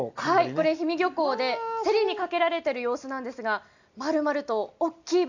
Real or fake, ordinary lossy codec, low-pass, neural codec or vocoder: real; none; 7.2 kHz; none